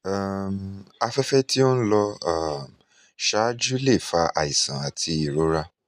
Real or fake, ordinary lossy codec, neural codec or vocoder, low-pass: real; none; none; 14.4 kHz